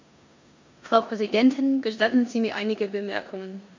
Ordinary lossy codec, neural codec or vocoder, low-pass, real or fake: MP3, 64 kbps; codec, 16 kHz in and 24 kHz out, 0.9 kbps, LongCat-Audio-Codec, four codebook decoder; 7.2 kHz; fake